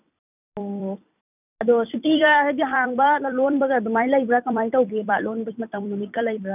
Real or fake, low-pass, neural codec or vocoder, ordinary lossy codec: fake; 3.6 kHz; vocoder, 44.1 kHz, 128 mel bands every 256 samples, BigVGAN v2; none